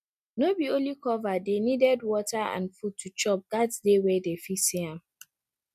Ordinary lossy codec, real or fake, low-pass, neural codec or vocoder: none; real; 14.4 kHz; none